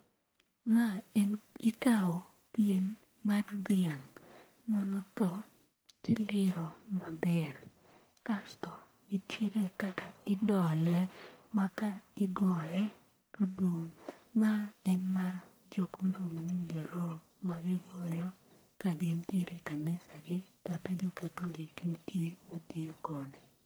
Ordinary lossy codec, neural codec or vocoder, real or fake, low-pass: none; codec, 44.1 kHz, 1.7 kbps, Pupu-Codec; fake; none